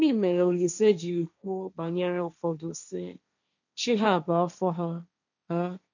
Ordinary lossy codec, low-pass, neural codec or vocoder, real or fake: none; 7.2 kHz; codec, 16 kHz, 1.1 kbps, Voila-Tokenizer; fake